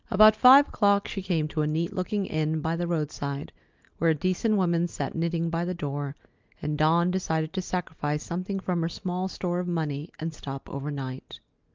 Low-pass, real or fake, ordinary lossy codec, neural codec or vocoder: 7.2 kHz; real; Opus, 24 kbps; none